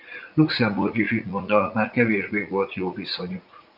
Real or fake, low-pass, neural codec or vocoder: fake; 5.4 kHz; vocoder, 44.1 kHz, 80 mel bands, Vocos